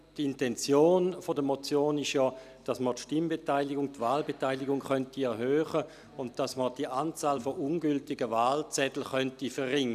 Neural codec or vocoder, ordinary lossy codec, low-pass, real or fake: none; none; 14.4 kHz; real